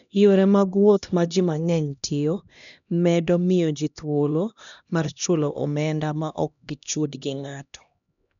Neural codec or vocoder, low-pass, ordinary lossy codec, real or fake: codec, 16 kHz, 1 kbps, X-Codec, HuBERT features, trained on LibriSpeech; 7.2 kHz; none; fake